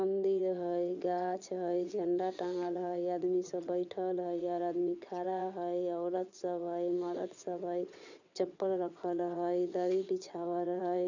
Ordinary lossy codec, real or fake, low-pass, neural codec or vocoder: none; fake; 7.2 kHz; vocoder, 22.05 kHz, 80 mel bands, WaveNeXt